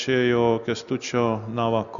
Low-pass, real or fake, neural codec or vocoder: 7.2 kHz; real; none